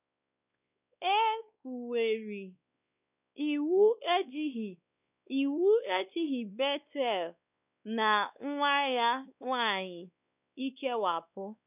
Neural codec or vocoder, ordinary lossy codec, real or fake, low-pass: codec, 16 kHz, 2 kbps, X-Codec, WavLM features, trained on Multilingual LibriSpeech; none; fake; 3.6 kHz